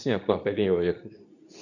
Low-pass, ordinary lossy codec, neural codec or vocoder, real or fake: 7.2 kHz; none; codec, 24 kHz, 0.9 kbps, WavTokenizer, medium speech release version 2; fake